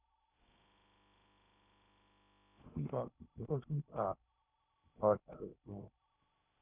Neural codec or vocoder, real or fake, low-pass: codec, 16 kHz in and 24 kHz out, 0.8 kbps, FocalCodec, streaming, 65536 codes; fake; 3.6 kHz